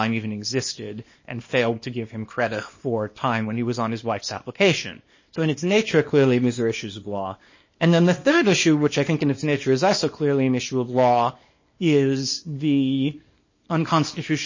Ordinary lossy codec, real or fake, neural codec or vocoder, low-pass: MP3, 32 kbps; fake; codec, 24 kHz, 0.9 kbps, WavTokenizer, small release; 7.2 kHz